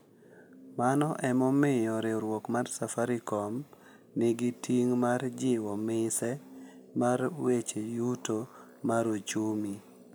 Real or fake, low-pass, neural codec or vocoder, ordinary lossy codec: real; none; none; none